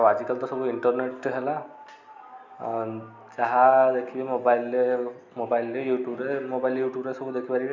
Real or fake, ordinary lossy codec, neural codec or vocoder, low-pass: real; none; none; 7.2 kHz